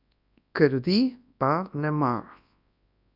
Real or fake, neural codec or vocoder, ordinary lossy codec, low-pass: fake; codec, 24 kHz, 0.9 kbps, WavTokenizer, large speech release; none; 5.4 kHz